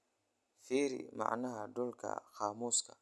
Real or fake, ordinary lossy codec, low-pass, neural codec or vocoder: real; none; none; none